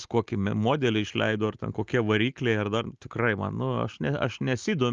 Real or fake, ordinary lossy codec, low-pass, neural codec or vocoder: real; Opus, 24 kbps; 7.2 kHz; none